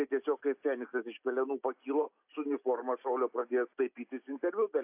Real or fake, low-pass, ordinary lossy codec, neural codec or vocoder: real; 3.6 kHz; AAC, 32 kbps; none